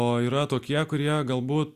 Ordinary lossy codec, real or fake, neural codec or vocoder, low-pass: Opus, 64 kbps; real; none; 14.4 kHz